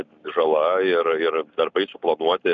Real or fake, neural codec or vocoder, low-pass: real; none; 7.2 kHz